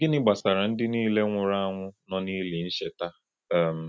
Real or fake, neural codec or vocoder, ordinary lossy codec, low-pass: real; none; none; none